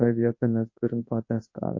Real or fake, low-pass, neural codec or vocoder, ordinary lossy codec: fake; 7.2 kHz; codec, 24 kHz, 0.9 kbps, WavTokenizer, large speech release; MP3, 32 kbps